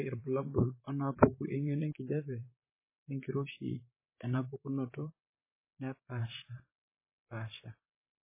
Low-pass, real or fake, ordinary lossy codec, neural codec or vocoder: 3.6 kHz; fake; MP3, 16 kbps; vocoder, 44.1 kHz, 128 mel bands, Pupu-Vocoder